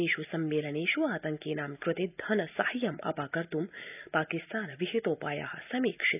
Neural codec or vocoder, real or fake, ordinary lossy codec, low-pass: none; real; none; 3.6 kHz